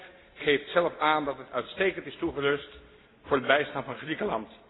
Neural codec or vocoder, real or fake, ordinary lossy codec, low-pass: none; real; AAC, 16 kbps; 7.2 kHz